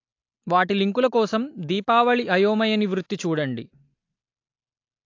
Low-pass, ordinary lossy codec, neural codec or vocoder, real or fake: 7.2 kHz; AAC, 48 kbps; none; real